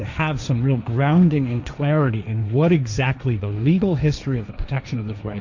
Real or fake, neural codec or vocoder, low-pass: fake; codec, 16 kHz, 1.1 kbps, Voila-Tokenizer; 7.2 kHz